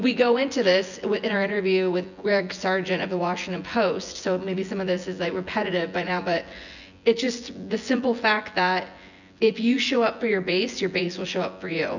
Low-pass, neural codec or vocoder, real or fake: 7.2 kHz; vocoder, 24 kHz, 100 mel bands, Vocos; fake